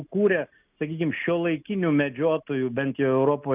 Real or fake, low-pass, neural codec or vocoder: real; 3.6 kHz; none